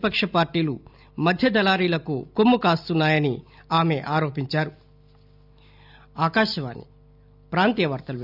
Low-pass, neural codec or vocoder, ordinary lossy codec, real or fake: 5.4 kHz; none; none; real